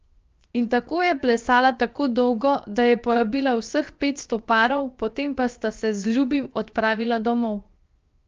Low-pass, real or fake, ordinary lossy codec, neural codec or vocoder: 7.2 kHz; fake; Opus, 24 kbps; codec, 16 kHz, 0.7 kbps, FocalCodec